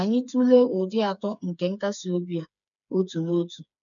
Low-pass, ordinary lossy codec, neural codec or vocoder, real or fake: 7.2 kHz; none; codec, 16 kHz, 4 kbps, FreqCodec, smaller model; fake